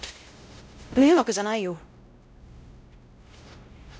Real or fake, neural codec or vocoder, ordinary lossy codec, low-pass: fake; codec, 16 kHz, 0.5 kbps, X-Codec, WavLM features, trained on Multilingual LibriSpeech; none; none